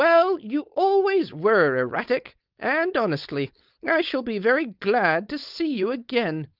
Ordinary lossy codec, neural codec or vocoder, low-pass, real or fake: Opus, 24 kbps; codec, 16 kHz, 4.8 kbps, FACodec; 5.4 kHz; fake